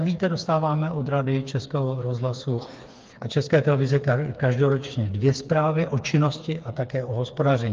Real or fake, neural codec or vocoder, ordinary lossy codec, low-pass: fake; codec, 16 kHz, 4 kbps, FreqCodec, smaller model; Opus, 32 kbps; 7.2 kHz